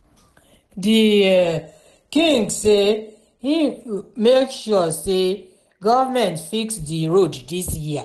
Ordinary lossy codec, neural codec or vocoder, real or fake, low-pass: Opus, 16 kbps; none; real; 10.8 kHz